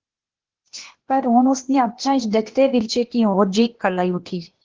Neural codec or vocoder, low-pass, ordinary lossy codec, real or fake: codec, 16 kHz, 0.8 kbps, ZipCodec; 7.2 kHz; Opus, 16 kbps; fake